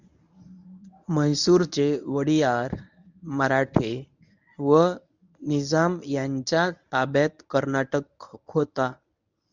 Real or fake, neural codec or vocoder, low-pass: fake; codec, 24 kHz, 0.9 kbps, WavTokenizer, medium speech release version 1; 7.2 kHz